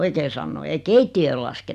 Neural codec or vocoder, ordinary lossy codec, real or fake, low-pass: none; none; real; 14.4 kHz